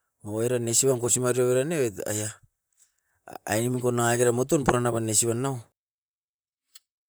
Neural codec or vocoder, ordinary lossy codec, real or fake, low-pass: vocoder, 44.1 kHz, 128 mel bands, Pupu-Vocoder; none; fake; none